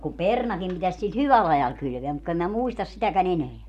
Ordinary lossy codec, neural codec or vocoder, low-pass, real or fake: none; none; 14.4 kHz; real